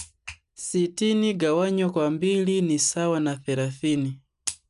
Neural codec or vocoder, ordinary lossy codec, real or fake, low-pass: none; none; real; 10.8 kHz